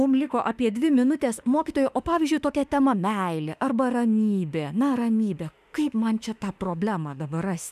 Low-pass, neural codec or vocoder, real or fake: 14.4 kHz; autoencoder, 48 kHz, 32 numbers a frame, DAC-VAE, trained on Japanese speech; fake